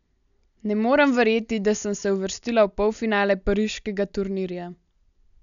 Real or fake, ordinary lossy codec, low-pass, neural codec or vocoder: real; none; 7.2 kHz; none